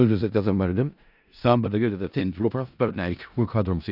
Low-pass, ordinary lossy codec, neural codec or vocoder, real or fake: 5.4 kHz; none; codec, 16 kHz in and 24 kHz out, 0.4 kbps, LongCat-Audio-Codec, four codebook decoder; fake